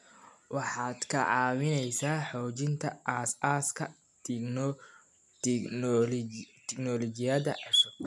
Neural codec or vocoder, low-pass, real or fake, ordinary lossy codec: none; none; real; none